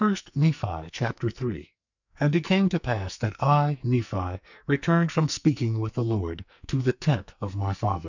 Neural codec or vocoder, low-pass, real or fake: codec, 44.1 kHz, 2.6 kbps, SNAC; 7.2 kHz; fake